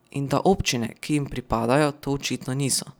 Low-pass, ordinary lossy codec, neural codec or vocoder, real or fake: none; none; none; real